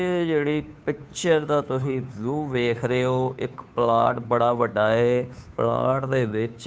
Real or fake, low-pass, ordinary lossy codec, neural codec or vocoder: fake; none; none; codec, 16 kHz, 2 kbps, FunCodec, trained on Chinese and English, 25 frames a second